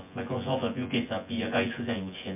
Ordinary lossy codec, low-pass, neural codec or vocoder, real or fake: MP3, 32 kbps; 3.6 kHz; vocoder, 24 kHz, 100 mel bands, Vocos; fake